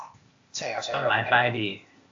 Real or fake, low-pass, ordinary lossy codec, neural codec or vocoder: fake; 7.2 kHz; AAC, 48 kbps; codec, 16 kHz, 0.8 kbps, ZipCodec